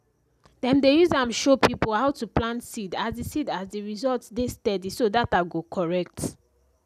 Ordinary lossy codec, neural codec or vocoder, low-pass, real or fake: none; none; 14.4 kHz; real